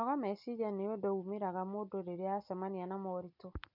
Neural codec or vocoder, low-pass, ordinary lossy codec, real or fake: none; 5.4 kHz; none; real